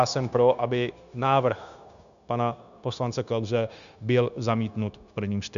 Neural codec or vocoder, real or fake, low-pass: codec, 16 kHz, 0.9 kbps, LongCat-Audio-Codec; fake; 7.2 kHz